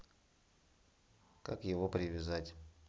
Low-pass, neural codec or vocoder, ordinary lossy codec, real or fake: none; none; none; real